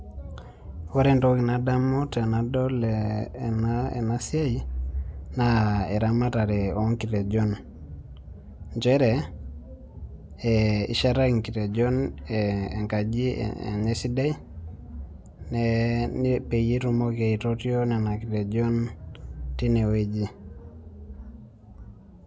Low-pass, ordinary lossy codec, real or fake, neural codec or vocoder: none; none; real; none